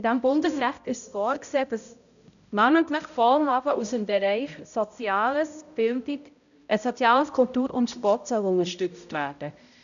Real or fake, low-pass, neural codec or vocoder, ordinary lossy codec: fake; 7.2 kHz; codec, 16 kHz, 0.5 kbps, X-Codec, HuBERT features, trained on balanced general audio; none